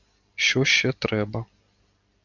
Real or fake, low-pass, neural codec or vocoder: real; 7.2 kHz; none